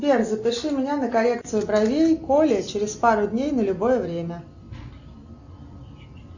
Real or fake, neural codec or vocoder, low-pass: real; none; 7.2 kHz